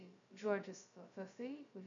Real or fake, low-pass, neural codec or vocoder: fake; 7.2 kHz; codec, 16 kHz, 0.2 kbps, FocalCodec